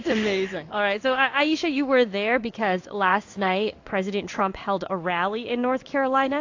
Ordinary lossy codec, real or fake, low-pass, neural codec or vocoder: AAC, 48 kbps; fake; 7.2 kHz; codec, 16 kHz in and 24 kHz out, 1 kbps, XY-Tokenizer